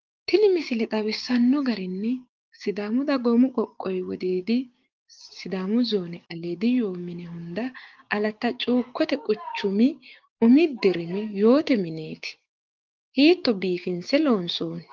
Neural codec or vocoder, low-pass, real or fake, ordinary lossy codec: codec, 16 kHz, 6 kbps, DAC; 7.2 kHz; fake; Opus, 32 kbps